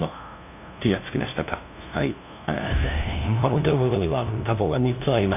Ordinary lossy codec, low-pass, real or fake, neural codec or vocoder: none; 3.6 kHz; fake; codec, 16 kHz, 0.5 kbps, FunCodec, trained on LibriTTS, 25 frames a second